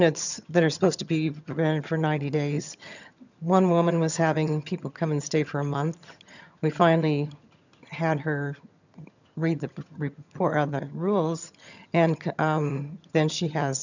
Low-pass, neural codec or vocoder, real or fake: 7.2 kHz; vocoder, 22.05 kHz, 80 mel bands, HiFi-GAN; fake